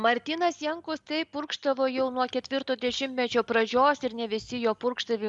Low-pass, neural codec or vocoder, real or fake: 10.8 kHz; none; real